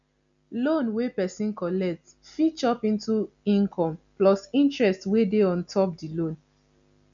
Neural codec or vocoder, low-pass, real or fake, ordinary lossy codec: none; 7.2 kHz; real; none